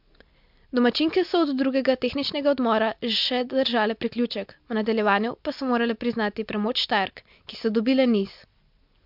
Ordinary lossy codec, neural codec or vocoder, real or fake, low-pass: MP3, 48 kbps; none; real; 5.4 kHz